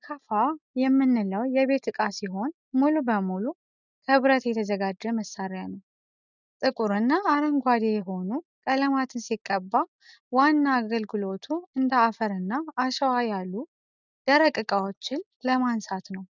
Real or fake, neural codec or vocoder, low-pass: real; none; 7.2 kHz